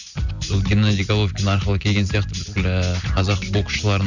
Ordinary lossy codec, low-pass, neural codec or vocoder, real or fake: none; 7.2 kHz; none; real